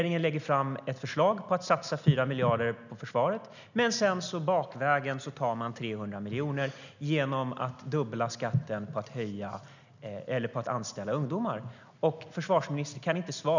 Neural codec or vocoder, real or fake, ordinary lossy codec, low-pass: none; real; none; 7.2 kHz